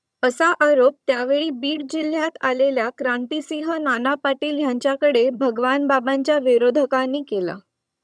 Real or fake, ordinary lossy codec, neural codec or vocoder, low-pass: fake; none; vocoder, 22.05 kHz, 80 mel bands, HiFi-GAN; none